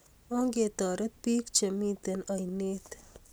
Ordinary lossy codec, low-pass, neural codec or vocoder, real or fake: none; none; none; real